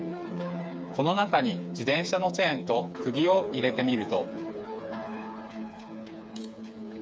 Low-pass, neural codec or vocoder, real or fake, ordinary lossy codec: none; codec, 16 kHz, 4 kbps, FreqCodec, smaller model; fake; none